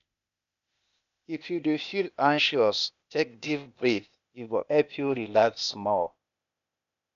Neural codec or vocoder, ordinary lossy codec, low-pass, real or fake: codec, 16 kHz, 0.8 kbps, ZipCodec; none; 7.2 kHz; fake